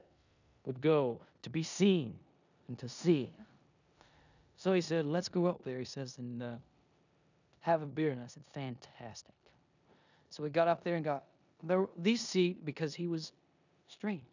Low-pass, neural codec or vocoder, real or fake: 7.2 kHz; codec, 16 kHz in and 24 kHz out, 0.9 kbps, LongCat-Audio-Codec, four codebook decoder; fake